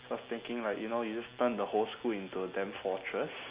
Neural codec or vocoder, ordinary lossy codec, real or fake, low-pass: none; Opus, 64 kbps; real; 3.6 kHz